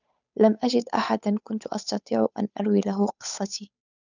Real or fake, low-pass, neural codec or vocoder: fake; 7.2 kHz; codec, 16 kHz, 8 kbps, FunCodec, trained on Chinese and English, 25 frames a second